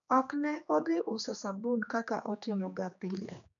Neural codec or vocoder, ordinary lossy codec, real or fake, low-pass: codec, 16 kHz, 2 kbps, X-Codec, HuBERT features, trained on general audio; none; fake; 7.2 kHz